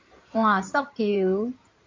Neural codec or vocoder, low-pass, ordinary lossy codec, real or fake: codec, 16 kHz in and 24 kHz out, 2.2 kbps, FireRedTTS-2 codec; 7.2 kHz; MP3, 48 kbps; fake